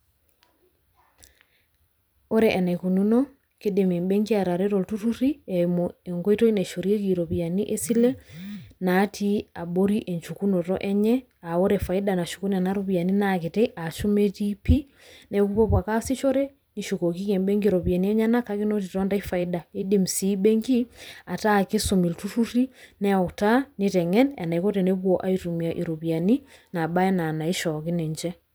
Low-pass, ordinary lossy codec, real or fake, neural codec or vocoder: none; none; fake; vocoder, 44.1 kHz, 128 mel bands every 256 samples, BigVGAN v2